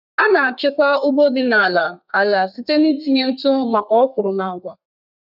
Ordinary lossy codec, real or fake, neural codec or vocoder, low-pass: none; fake; codec, 32 kHz, 1.9 kbps, SNAC; 5.4 kHz